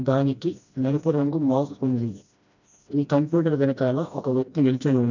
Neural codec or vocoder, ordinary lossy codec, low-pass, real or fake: codec, 16 kHz, 1 kbps, FreqCodec, smaller model; none; 7.2 kHz; fake